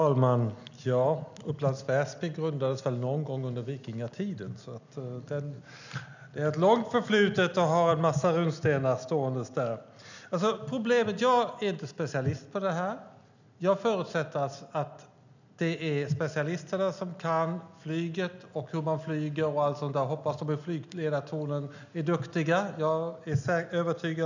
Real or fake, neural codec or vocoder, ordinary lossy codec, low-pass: real; none; none; 7.2 kHz